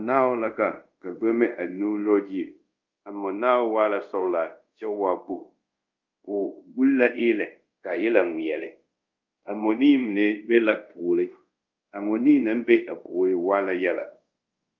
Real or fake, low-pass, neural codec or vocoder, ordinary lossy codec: fake; 7.2 kHz; codec, 24 kHz, 0.5 kbps, DualCodec; Opus, 32 kbps